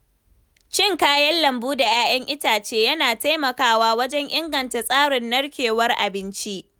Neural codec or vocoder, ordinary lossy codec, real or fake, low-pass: none; none; real; none